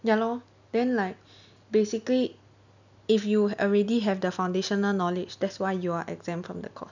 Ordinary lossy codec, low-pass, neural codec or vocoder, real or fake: none; 7.2 kHz; none; real